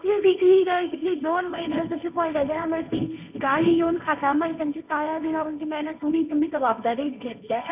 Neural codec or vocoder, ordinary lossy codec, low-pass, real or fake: codec, 16 kHz, 1.1 kbps, Voila-Tokenizer; none; 3.6 kHz; fake